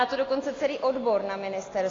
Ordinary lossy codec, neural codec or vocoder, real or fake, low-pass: AAC, 32 kbps; none; real; 7.2 kHz